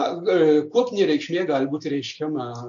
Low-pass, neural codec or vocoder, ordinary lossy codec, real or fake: 7.2 kHz; none; AAC, 48 kbps; real